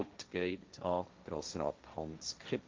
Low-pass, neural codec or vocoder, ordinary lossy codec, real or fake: 7.2 kHz; codec, 16 kHz, 1.1 kbps, Voila-Tokenizer; Opus, 32 kbps; fake